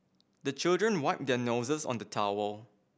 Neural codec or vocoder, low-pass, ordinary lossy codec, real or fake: none; none; none; real